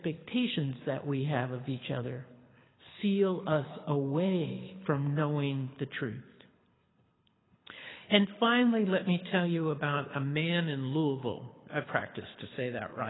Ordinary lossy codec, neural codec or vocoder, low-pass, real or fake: AAC, 16 kbps; codec, 24 kHz, 6 kbps, HILCodec; 7.2 kHz; fake